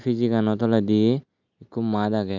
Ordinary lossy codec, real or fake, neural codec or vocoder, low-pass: none; real; none; 7.2 kHz